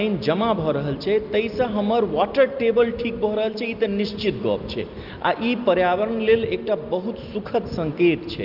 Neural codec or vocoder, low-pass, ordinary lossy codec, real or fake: none; 5.4 kHz; Opus, 24 kbps; real